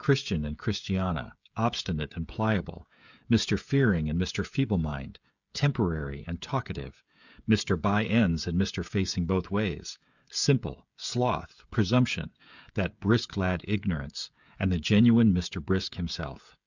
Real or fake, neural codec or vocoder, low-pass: fake; codec, 16 kHz, 16 kbps, FreqCodec, smaller model; 7.2 kHz